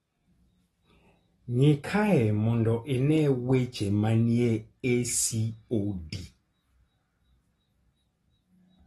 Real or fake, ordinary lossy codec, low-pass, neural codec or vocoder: real; AAC, 32 kbps; 19.8 kHz; none